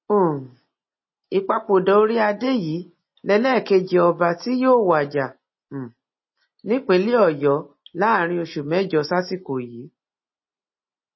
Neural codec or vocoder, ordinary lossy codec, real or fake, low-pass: none; MP3, 24 kbps; real; 7.2 kHz